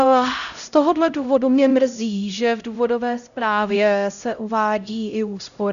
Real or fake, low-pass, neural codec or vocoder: fake; 7.2 kHz; codec, 16 kHz, 0.5 kbps, X-Codec, HuBERT features, trained on LibriSpeech